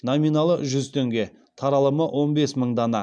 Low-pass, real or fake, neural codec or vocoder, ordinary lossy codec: 9.9 kHz; real; none; none